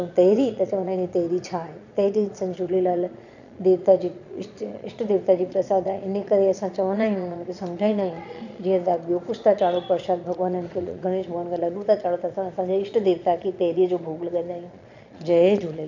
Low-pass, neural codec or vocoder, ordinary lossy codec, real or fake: 7.2 kHz; vocoder, 22.05 kHz, 80 mel bands, Vocos; none; fake